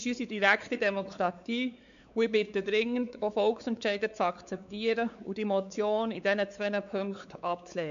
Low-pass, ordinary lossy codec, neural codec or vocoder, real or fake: 7.2 kHz; none; codec, 16 kHz, 4 kbps, X-Codec, WavLM features, trained on Multilingual LibriSpeech; fake